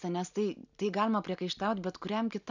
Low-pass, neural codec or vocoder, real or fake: 7.2 kHz; none; real